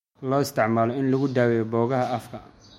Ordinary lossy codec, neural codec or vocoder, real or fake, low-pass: MP3, 64 kbps; autoencoder, 48 kHz, 128 numbers a frame, DAC-VAE, trained on Japanese speech; fake; 19.8 kHz